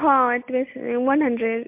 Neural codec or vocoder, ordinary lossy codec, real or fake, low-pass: none; MP3, 32 kbps; real; 3.6 kHz